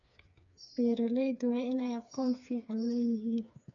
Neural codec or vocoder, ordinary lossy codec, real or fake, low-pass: codec, 16 kHz, 4 kbps, FreqCodec, smaller model; none; fake; 7.2 kHz